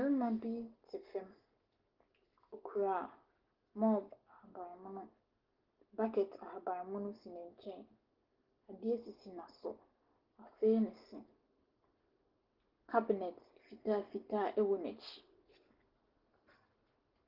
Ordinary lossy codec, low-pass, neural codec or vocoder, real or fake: Opus, 16 kbps; 5.4 kHz; none; real